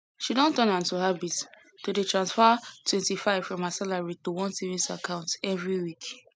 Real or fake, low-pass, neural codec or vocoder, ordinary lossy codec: real; none; none; none